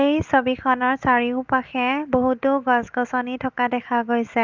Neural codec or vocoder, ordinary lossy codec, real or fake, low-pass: none; Opus, 32 kbps; real; 7.2 kHz